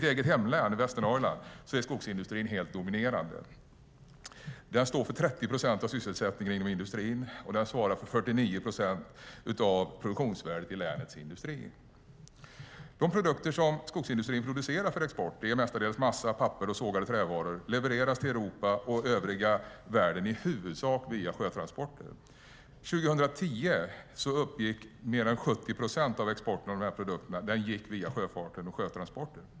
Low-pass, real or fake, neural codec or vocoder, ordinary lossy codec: none; real; none; none